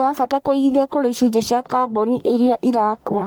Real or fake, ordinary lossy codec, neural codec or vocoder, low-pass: fake; none; codec, 44.1 kHz, 1.7 kbps, Pupu-Codec; none